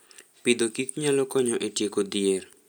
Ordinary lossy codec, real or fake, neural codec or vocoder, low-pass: none; real; none; none